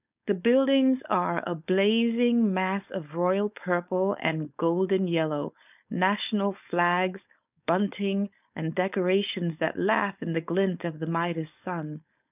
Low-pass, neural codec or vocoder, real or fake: 3.6 kHz; codec, 16 kHz, 4.8 kbps, FACodec; fake